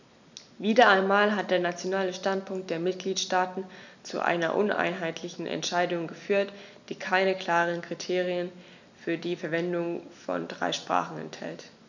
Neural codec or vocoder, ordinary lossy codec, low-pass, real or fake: none; none; 7.2 kHz; real